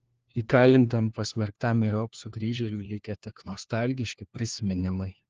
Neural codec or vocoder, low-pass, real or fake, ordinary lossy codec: codec, 16 kHz, 1 kbps, FunCodec, trained on LibriTTS, 50 frames a second; 7.2 kHz; fake; Opus, 16 kbps